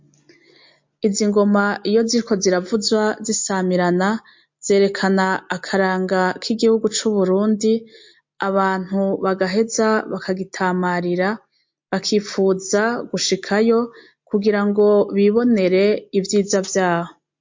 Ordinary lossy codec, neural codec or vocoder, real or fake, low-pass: MP3, 48 kbps; none; real; 7.2 kHz